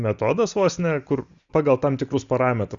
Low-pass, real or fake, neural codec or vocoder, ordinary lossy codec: 7.2 kHz; real; none; Opus, 64 kbps